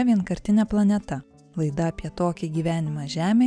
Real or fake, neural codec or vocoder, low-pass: real; none; 9.9 kHz